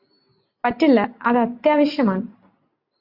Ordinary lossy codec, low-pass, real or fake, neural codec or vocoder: Opus, 64 kbps; 5.4 kHz; real; none